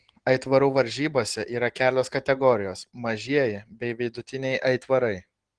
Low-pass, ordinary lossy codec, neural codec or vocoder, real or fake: 9.9 kHz; Opus, 16 kbps; none; real